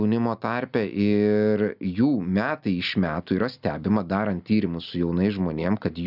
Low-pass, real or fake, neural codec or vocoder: 5.4 kHz; real; none